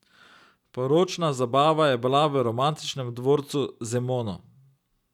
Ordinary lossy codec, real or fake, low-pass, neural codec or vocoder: none; real; 19.8 kHz; none